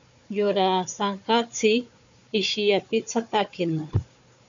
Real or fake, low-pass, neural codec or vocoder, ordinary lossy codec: fake; 7.2 kHz; codec, 16 kHz, 4 kbps, FunCodec, trained on Chinese and English, 50 frames a second; MP3, 64 kbps